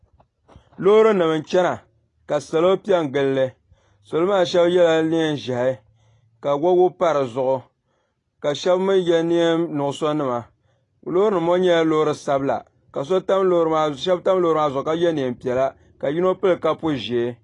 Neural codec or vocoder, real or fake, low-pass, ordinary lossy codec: none; real; 10.8 kHz; AAC, 32 kbps